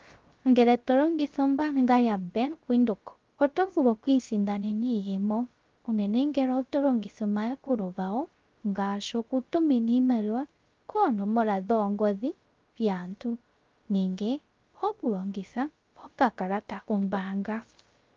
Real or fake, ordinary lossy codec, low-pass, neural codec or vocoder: fake; Opus, 32 kbps; 7.2 kHz; codec, 16 kHz, 0.3 kbps, FocalCodec